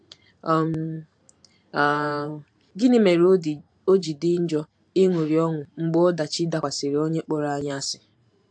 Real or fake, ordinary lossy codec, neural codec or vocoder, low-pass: fake; AAC, 64 kbps; vocoder, 44.1 kHz, 128 mel bands every 512 samples, BigVGAN v2; 9.9 kHz